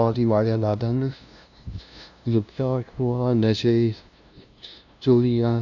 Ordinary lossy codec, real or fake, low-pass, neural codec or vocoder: none; fake; 7.2 kHz; codec, 16 kHz, 0.5 kbps, FunCodec, trained on LibriTTS, 25 frames a second